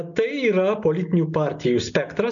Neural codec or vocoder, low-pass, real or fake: none; 7.2 kHz; real